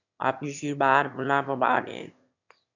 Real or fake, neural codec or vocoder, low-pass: fake; autoencoder, 22.05 kHz, a latent of 192 numbers a frame, VITS, trained on one speaker; 7.2 kHz